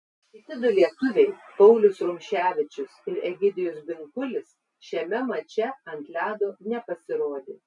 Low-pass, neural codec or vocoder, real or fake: 10.8 kHz; none; real